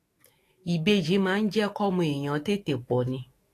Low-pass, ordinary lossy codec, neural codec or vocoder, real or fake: 14.4 kHz; AAC, 48 kbps; autoencoder, 48 kHz, 128 numbers a frame, DAC-VAE, trained on Japanese speech; fake